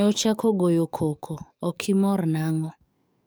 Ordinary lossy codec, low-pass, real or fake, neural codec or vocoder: none; none; fake; codec, 44.1 kHz, 7.8 kbps, DAC